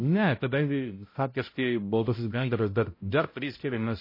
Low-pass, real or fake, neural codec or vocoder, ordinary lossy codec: 5.4 kHz; fake; codec, 16 kHz, 0.5 kbps, X-Codec, HuBERT features, trained on balanced general audio; MP3, 24 kbps